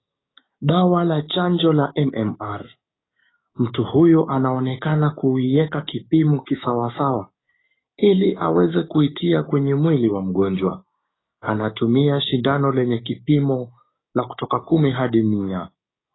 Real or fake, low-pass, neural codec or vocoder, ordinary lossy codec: fake; 7.2 kHz; codec, 16 kHz, 6 kbps, DAC; AAC, 16 kbps